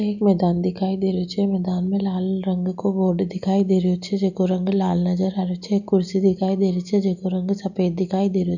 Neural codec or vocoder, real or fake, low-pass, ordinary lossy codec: none; real; 7.2 kHz; none